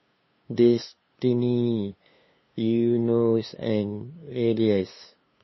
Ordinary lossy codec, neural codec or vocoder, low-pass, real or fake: MP3, 24 kbps; codec, 16 kHz, 2 kbps, FunCodec, trained on LibriTTS, 25 frames a second; 7.2 kHz; fake